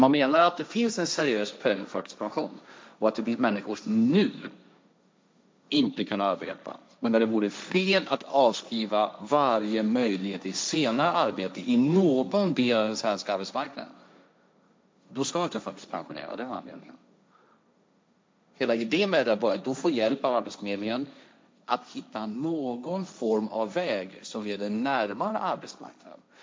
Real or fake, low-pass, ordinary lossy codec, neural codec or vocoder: fake; none; none; codec, 16 kHz, 1.1 kbps, Voila-Tokenizer